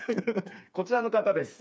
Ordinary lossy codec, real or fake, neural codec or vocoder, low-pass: none; fake; codec, 16 kHz, 4 kbps, FreqCodec, smaller model; none